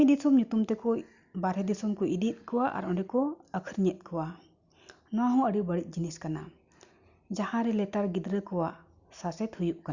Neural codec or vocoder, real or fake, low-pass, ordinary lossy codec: none; real; 7.2 kHz; Opus, 64 kbps